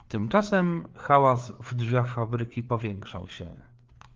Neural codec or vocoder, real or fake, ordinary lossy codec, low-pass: codec, 16 kHz, 4 kbps, FreqCodec, larger model; fake; Opus, 32 kbps; 7.2 kHz